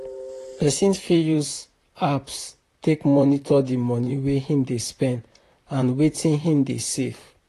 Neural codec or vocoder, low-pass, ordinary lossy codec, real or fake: vocoder, 44.1 kHz, 128 mel bands, Pupu-Vocoder; 14.4 kHz; AAC, 48 kbps; fake